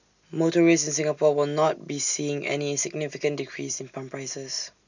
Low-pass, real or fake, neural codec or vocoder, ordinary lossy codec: 7.2 kHz; real; none; none